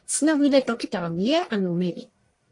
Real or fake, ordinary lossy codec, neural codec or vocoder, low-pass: fake; AAC, 48 kbps; codec, 44.1 kHz, 1.7 kbps, Pupu-Codec; 10.8 kHz